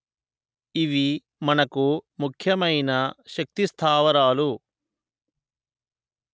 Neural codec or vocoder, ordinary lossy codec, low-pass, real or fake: none; none; none; real